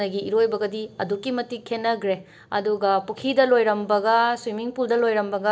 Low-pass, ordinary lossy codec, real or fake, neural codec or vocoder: none; none; real; none